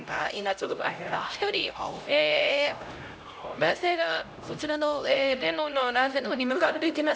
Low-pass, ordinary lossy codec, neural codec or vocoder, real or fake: none; none; codec, 16 kHz, 0.5 kbps, X-Codec, HuBERT features, trained on LibriSpeech; fake